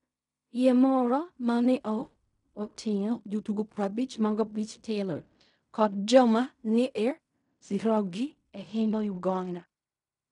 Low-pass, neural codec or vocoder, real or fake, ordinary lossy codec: 10.8 kHz; codec, 16 kHz in and 24 kHz out, 0.4 kbps, LongCat-Audio-Codec, fine tuned four codebook decoder; fake; none